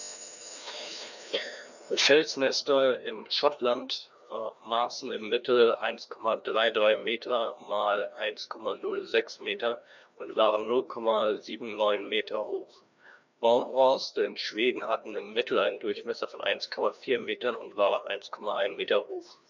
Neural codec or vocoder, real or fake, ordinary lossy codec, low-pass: codec, 16 kHz, 1 kbps, FreqCodec, larger model; fake; none; 7.2 kHz